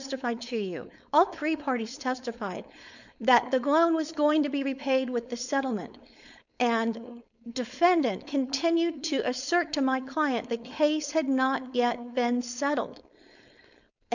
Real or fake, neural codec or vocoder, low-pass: fake; codec, 16 kHz, 4.8 kbps, FACodec; 7.2 kHz